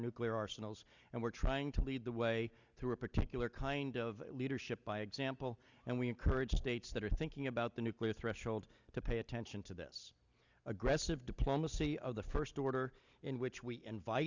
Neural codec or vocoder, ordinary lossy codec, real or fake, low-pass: none; Opus, 64 kbps; real; 7.2 kHz